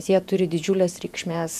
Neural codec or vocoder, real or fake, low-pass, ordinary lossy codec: vocoder, 48 kHz, 128 mel bands, Vocos; fake; 14.4 kHz; MP3, 96 kbps